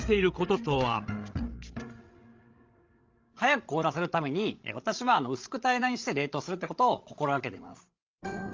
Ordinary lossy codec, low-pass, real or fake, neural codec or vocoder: Opus, 32 kbps; 7.2 kHz; fake; codec, 16 kHz, 16 kbps, FreqCodec, larger model